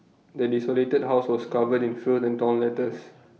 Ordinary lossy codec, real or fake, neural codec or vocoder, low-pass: none; real; none; none